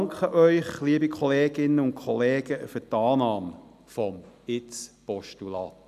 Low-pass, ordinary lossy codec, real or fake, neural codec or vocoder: 14.4 kHz; none; real; none